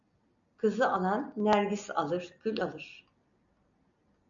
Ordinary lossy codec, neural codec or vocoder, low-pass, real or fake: MP3, 96 kbps; none; 7.2 kHz; real